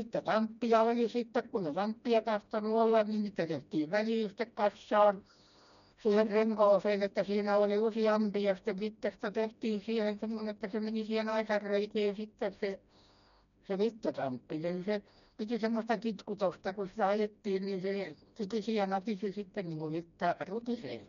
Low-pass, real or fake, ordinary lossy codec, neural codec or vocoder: 7.2 kHz; fake; none; codec, 16 kHz, 1 kbps, FreqCodec, smaller model